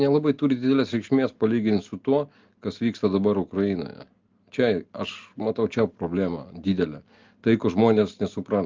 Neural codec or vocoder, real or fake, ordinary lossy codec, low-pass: none; real; Opus, 32 kbps; 7.2 kHz